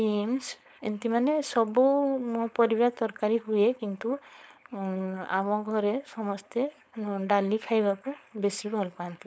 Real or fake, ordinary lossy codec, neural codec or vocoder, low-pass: fake; none; codec, 16 kHz, 4.8 kbps, FACodec; none